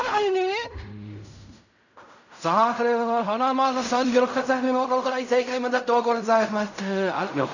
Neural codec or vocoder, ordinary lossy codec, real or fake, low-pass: codec, 16 kHz in and 24 kHz out, 0.4 kbps, LongCat-Audio-Codec, fine tuned four codebook decoder; none; fake; 7.2 kHz